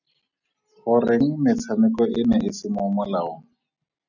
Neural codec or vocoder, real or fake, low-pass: none; real; 7.2 kHz